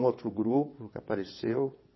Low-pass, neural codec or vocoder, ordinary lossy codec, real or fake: 7.2 kHz; vocoder, 22.05 kHz, 80 mel bands, WaveNeXt; MP3, 24 kbps; fake